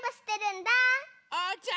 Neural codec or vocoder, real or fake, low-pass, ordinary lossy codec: none; real; none; none